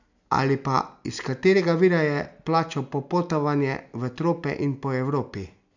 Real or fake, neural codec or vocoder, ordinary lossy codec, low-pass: real; none; none; 7.2 kHz